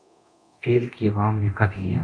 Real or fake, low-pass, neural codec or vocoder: fake; 9.9 kHz; codec, 24 kHz, 0.9 kbps, DualCodec